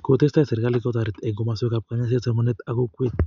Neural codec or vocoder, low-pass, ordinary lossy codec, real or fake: none; 7.2 kHz; MP3, 96 kbps; real